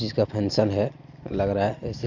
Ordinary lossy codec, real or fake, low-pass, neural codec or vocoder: none; real; 7.2 kHz; none